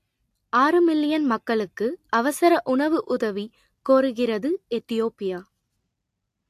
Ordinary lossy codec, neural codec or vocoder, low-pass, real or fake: AAC, 64 kbps; none; 14.4 kHz; real